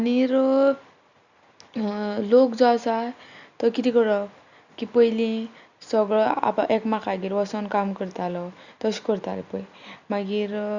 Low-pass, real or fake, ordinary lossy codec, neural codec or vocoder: 7.2 kHz; real; Opus, 64 kbps; none